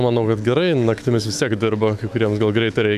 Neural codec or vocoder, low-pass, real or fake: autoencoder, 48 kHz, 128 numbers a frame, DAC-VAE, trained on Japanese speech; 14.4 kHz; fake